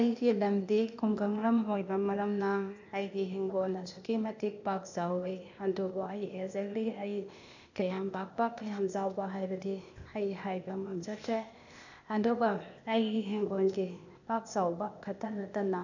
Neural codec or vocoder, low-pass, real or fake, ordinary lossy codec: codec, 16 kHz, 0.8 kbps, ZipCodec; 7.2 kHz; fake; none